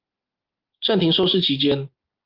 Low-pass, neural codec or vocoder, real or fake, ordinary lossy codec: 5.4 kHz; none; real; Opus, 24 kbps